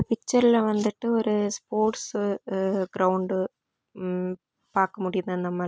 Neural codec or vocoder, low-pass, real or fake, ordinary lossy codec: none; none; real; none